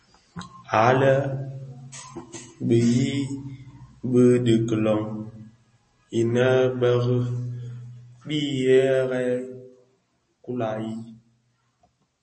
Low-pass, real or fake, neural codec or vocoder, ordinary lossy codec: 10.8 kHz; real; none; MP3, 32 kbps